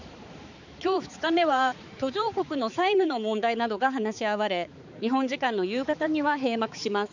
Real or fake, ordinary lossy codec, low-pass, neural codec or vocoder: fake; none; 7.2 kHz; codec, 16 kHz, 4 kbps, X-Codec, HuBERT features, trained on balanced general audio